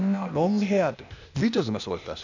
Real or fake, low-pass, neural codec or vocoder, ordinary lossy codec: fake; 7.2 kHz; codec, 16 kHz, 0.8 kbps, ZipCodec; none